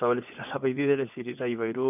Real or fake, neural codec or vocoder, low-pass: fake; vocoder, 24 kHz, 100 mel bands, Vocos; 3.6 kHz